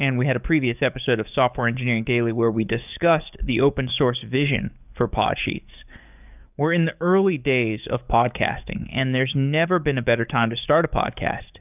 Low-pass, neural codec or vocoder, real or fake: 3.6 kHz; codec, 44.1 kHz, 7.8 kbps, DAC; fake